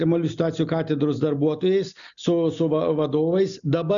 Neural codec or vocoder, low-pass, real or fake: none; 7.2 kHz; real